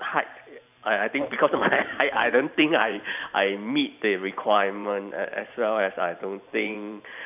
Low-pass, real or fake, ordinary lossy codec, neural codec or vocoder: 3.6 kHz; fake; none; vocoder, 44.1 kHz, 128 mel bands every 512 samples, BigVGAN v2